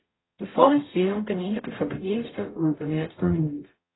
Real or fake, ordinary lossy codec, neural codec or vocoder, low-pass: fake; AAC, 16 kbps; codec, 44.1 kHz, 0.9 kbps, DAC; 7.2 kHz